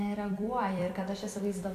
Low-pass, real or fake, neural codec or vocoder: 14.4 kHz; fake; vocoder, 44.1 kHz, 128 mel bands every 256 samples, BigVGAN v2